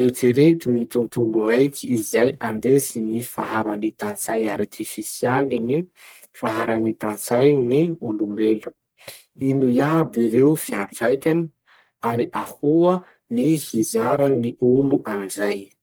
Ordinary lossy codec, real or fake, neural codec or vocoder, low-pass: none; fake; codec, 44.1 kHz, 1.7 kbps, Pupu-Codec; none